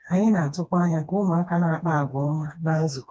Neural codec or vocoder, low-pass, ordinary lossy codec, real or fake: codec, 16 kHz, 2 kbps, FreqCodec, smaller model; none; none; fake